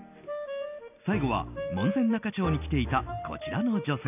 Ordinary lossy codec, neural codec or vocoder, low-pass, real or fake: none; none; 3.6 kHz; real